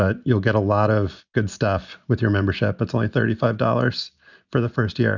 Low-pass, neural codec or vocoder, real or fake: 7.2 kHz; none; real